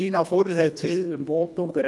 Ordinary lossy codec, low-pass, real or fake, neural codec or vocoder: none; none; fake; codec, 24 kHz, 1.5 kbps, HILCodec